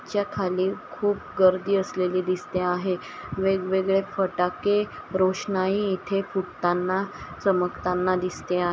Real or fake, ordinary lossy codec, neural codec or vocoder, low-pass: real; none; none; none